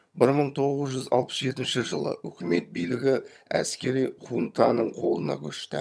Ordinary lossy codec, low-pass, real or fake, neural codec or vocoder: none; none; fake; vocoder, 22.05 kHz, 80 mel bands, HiFi-GAN